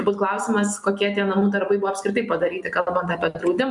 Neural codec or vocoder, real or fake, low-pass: none; real; 10.8 kHz